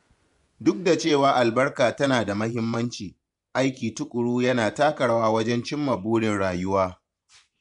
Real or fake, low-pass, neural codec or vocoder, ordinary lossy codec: fake; 10.8 kHz; vocoder, 24 kHz, 100 mel bands, Vocos; none